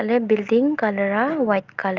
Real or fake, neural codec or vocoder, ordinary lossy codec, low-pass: real; none; Opus, 32 kbps; 7.2 kHz